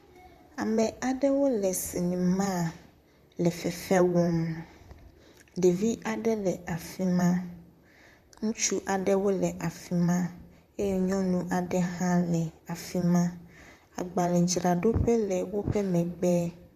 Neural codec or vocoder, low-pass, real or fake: vocoder, 44.1 kHz, 128 mel bands, Pupu-Vocoder; 14.4 kHz; fake